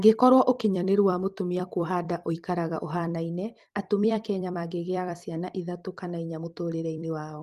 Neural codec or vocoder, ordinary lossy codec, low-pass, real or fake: vocoder, 44.1 kHz, 128 mel bands every 256 samples, BigVGAN v2; Opus, 24 kbps; 14.4 kHz; fake